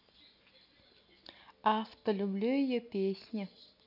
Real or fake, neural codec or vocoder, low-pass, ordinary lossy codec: real; none; 5.4 kHz; none